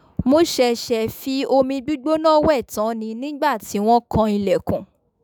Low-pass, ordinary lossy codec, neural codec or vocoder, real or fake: none; none; autoencoder, 48 kHz, 128 numbers a frame, DAC-VAE, trained on Japanese speech; fake